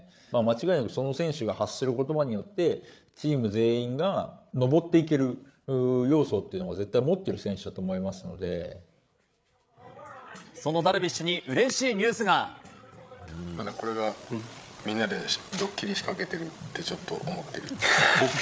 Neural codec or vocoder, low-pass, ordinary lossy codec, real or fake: codec, 16 kHz, 8 kbps, FreqCodec, larger model; none; none; fake